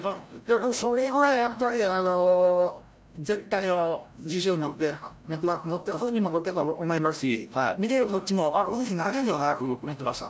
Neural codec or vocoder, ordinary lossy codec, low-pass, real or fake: codec, 16 kHz, 0.5 kbps, FreqCodec, larger model; none; none; fake